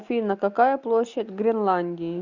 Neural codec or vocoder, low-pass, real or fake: none; 7.2 kHz; real